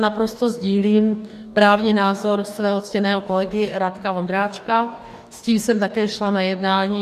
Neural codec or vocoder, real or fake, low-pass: codec, 44.1 kHz, 2.6 kbps, DAC; fake; 14.4 kHz